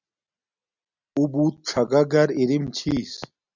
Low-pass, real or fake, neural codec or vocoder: 7.2 kHz; real; none